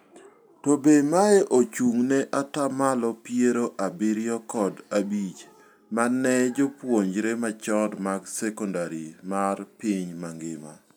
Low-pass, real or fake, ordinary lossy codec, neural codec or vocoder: none; real; none; none